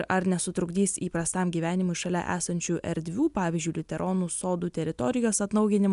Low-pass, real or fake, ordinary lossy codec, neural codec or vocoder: 10.8 kHz; real; MP3, 96 kbps; none